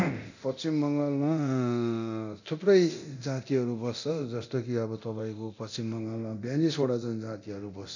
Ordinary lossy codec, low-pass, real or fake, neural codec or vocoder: none; 7.2 kHz; fake; codec, 24 kHz, 0.9 kbps, DualCodec